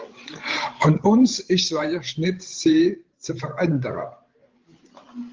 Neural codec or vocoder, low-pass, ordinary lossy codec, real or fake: codec, 16 kHz, 16 kbps, FreqCodec, larger model; 7.2 kHz; Opus, 16 kbps; fake